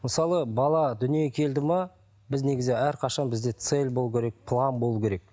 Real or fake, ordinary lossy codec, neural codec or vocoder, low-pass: real; none; none; none